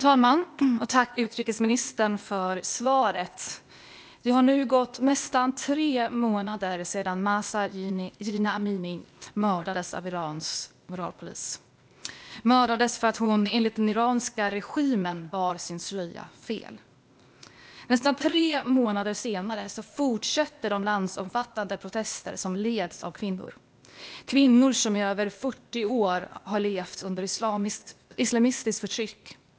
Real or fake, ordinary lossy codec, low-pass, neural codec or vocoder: fake; none; none; codec, 16 kHz, 0.8 kbps, ZipCodec